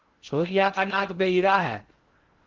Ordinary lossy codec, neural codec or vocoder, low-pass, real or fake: Opus, 16 kbps; codec, 16 kHz in and 24 kHz out, 0.6 kbps, FocalCodec, streaming, 2048 codes; 7.2 kHz; fake